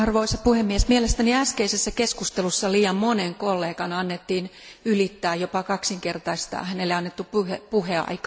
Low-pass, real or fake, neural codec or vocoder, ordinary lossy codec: none; real; none; none